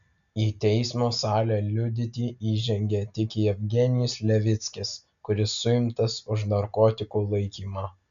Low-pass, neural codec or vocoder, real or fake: 7.2 kHz; none; real